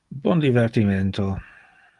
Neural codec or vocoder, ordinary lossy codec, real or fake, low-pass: none; Opus, 24 kbps; real; 10.8 kHz